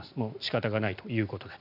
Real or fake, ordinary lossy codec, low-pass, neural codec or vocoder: real; none; 5.4 kHz; none